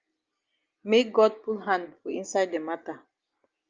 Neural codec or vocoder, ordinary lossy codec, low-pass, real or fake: none; Opus, 24 kbps; 7.2 kHz; real